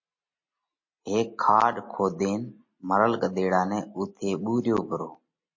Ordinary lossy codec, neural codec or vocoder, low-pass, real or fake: MP3, 32 kbps; none; 7.2 kHz; real